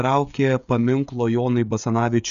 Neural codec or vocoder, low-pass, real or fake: codec, 16 kHz, 16 kbps, FreqCodec, smaller model; 7.2 kHz; fake